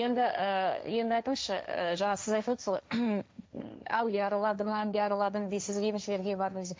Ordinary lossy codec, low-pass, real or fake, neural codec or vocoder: none; none; fake; codec, 16 kHz, 1.1 kbps, Voila-Tokenizer